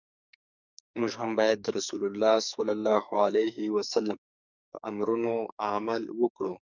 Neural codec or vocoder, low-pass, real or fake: codec, 44.1 kHz, 2.6 kbps, SNAC; 7.2 kHz; fake